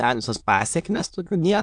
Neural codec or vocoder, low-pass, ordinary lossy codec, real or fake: autoencoder, 22.05 kHz, a latent of 192 numbers a frame, VITS, trained on many speakers; 9.9 kHz; AAC, 64 kbps; fake